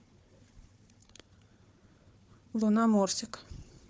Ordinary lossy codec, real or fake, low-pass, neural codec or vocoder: none; fake; none; codec, 16 kHz, 4 kbps, FunCodec, trained on Chinese and English, 50 frames a second